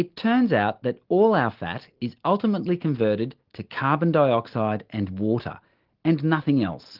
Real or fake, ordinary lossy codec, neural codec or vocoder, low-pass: real; Opus, 16 kbps; none; 5.4 kHz